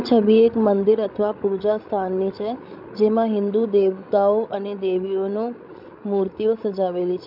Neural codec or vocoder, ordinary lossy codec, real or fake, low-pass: codec, 16 kHz, 16 kbps, FreqCodec, larger model; none; fake; 5.4 kHz